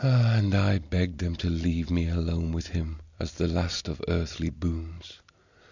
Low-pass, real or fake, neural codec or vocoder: 7.2 kHz; real; none